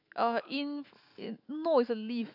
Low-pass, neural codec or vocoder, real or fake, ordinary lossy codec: 5.4 kHz; autoencoder, 48 kHz, 128 numbers a frame, DAC-VAE, trained on Japanese speech; fake; AAC, 48 kbps